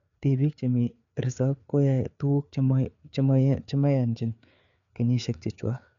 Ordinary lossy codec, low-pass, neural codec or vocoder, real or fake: none; 7.2 kHz; codec, 16 kHz, 4 kbps, FreqCodec, larger model; fake